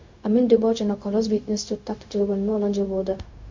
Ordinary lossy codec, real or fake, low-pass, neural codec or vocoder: MP3, 48 kbps; fake; 7.2 kHz; codec, 16 kHz, 0.4 kbps, LongCat-Audio-Codec